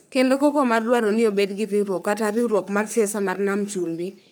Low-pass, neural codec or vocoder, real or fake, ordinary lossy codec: none; codec, 44.1 kHz, 3.4 kbps, Pupu-Codec; fake; none